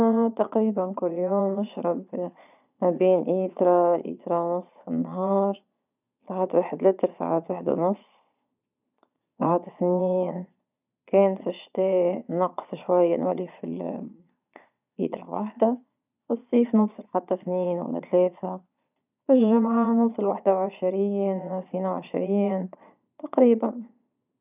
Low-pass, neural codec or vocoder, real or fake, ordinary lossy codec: 3.6 kHz; vocoder, 22.05 kHz, 80 mel bands, Vocos; fake; none